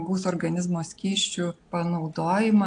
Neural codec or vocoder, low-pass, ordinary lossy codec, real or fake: none; 9.9 kHz; AAC, 48 kbps; real